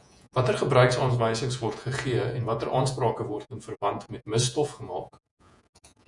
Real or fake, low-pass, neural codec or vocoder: fake; 10.8 kHz; vocoder, 48 kHz, 128 mel bands, Vocos